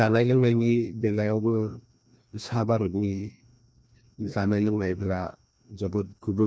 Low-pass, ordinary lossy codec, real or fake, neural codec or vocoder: none; none; fake; codec, 16 kHz, 1 kbps, FreqCodec, larger model